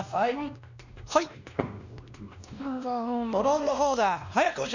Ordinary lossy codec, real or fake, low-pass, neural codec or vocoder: none; fake; 7.2 kHz; codec, 16 kHz, 1 kbps, X-Codec, WavLM features, trained on Multilingual LibriSpeech